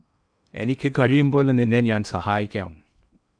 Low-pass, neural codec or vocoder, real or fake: 9.9 kHz; codec, 16 kHz in and 24 kHz out, 0.8 kbps, FocalCodec, streaming, 65536 codes; fake